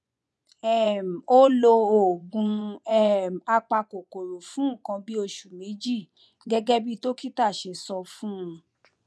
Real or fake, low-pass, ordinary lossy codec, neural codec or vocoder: fake; none; none; vocoder, 24 kHz, 100 mel bands, Vocos